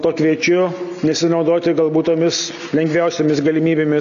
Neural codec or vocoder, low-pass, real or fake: none; 7.2 kHz; real